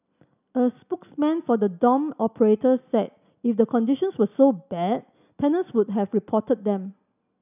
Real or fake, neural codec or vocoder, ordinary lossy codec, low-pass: real; none; none; 3.6 kHz